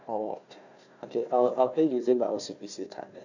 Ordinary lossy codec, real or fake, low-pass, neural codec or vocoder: none; fake; 7.2 kHz; codec, 16 kHz, 1 kbps, FunCodec, trained on Chinese and English, 50 frames a second